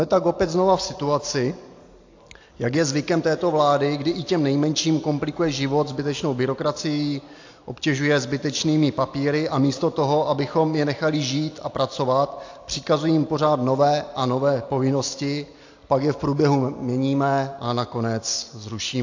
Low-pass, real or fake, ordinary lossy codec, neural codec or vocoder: 7.2 kHz; real; AAC, 48 kbps; none